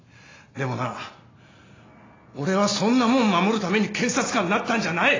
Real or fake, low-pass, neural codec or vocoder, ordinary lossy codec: real; 7.2 kHz; none; AAC, 32 kbps